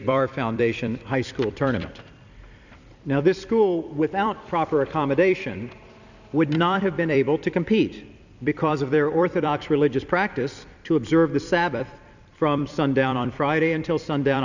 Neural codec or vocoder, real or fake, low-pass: vocoder, 44.1 kHz, 128 mel bands every 256 samples, BigVGAN v2; fake; 7.2 kHz